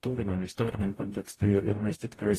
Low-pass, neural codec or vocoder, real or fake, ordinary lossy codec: 14.4 kHz; codec, 44.1 kHz, 0.9 kbps, DAC; fake; AAC, 48 kbps